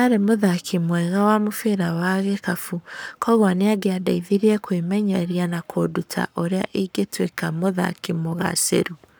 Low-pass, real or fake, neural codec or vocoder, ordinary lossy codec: none; fake; codec, 44.1 kHz, 7.8 kbps, Pupu-Codec; none